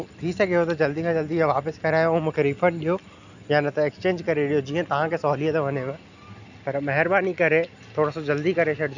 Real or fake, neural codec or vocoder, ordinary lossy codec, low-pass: real; none; none; 7.2 kHz